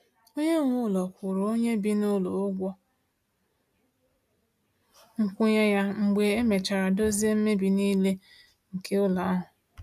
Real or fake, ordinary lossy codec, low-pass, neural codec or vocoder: real; AAC, 96 kbps; 14.4 kHz; none